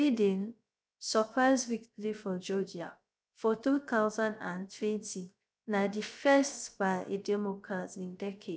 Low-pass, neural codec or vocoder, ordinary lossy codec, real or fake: none; codec, 16 kHz, 0.3 kbps, FocalCodec; none; fake